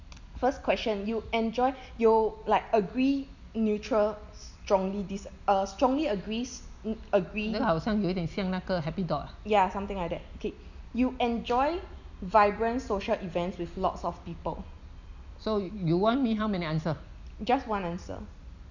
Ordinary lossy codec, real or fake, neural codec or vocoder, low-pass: none; real; none; 7.2 kHz